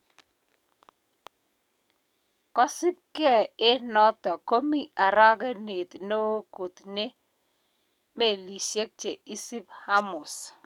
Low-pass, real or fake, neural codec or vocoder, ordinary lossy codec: 19.8 kHz; fake; codec, 44.1 kHz, 7.8 kbps, DAC; none